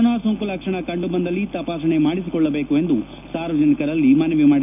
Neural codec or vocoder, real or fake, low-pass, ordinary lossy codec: none; real; 3.6 kHz; none